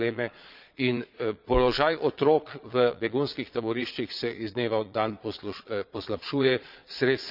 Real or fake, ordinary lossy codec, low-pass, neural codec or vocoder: fake; none; 5.4 kHz; vocoder, 22.05 kHz, 80 mel bands, Vocos